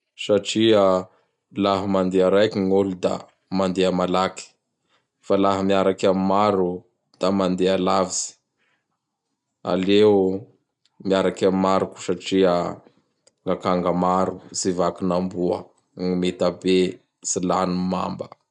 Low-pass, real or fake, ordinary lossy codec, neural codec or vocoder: 10.8 kHz; real; none; none